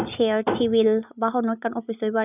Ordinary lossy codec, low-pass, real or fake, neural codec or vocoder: none; 3.6 kHz; real; none